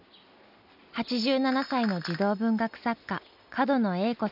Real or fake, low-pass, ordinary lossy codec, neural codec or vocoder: real; 5.4 kHz; none; none